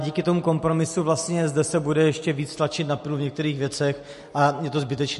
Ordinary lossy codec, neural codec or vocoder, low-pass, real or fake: MP3, 48 kbps; none; 14.4 kHz; real